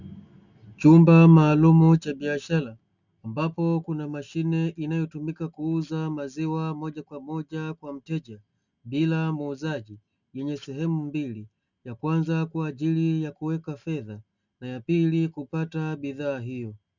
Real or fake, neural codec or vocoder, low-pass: real; none; 7.2 kHz